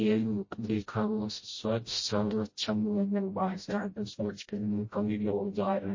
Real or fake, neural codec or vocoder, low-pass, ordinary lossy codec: fake; codec, 16 kHz, 0.5 kbps, FreqCodec, smaller model; 7.2 kHz; MP3, 32 kbps